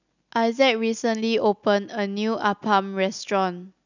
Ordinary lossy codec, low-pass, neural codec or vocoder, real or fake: none; 7.2 kHz; none; real